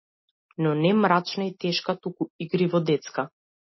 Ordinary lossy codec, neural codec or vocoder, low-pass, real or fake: MP3, 24 kbps; none; 7.2 kHz; real